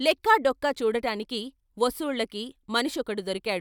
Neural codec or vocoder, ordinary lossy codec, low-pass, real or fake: none; none; none; real